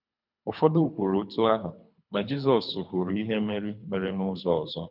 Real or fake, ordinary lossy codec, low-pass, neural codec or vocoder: fake; none; 5.4 kHz; codec, 24 kHz, 3 kbps, HILCodec